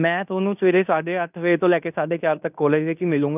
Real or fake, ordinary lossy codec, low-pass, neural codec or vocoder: fake; none; 3.6 kHz; codec, 16 kHz in and 24 kHz out, 0.9 kbps, LongCat-Audio-Codec, fine tuned four codebook decoder